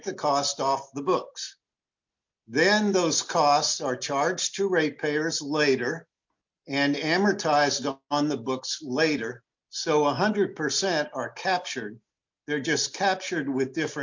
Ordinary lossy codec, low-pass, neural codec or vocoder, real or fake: MP3, 48 kbps; 7.2 kHz; none; real